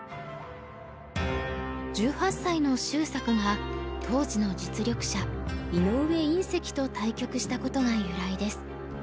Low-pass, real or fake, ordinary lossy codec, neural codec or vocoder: none; real; none; none